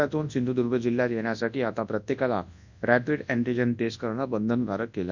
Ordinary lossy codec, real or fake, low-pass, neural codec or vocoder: none; fake; 7.2 kHz; codec, 24 kHz, 0.9 kbps, WavTokenizer, large speech release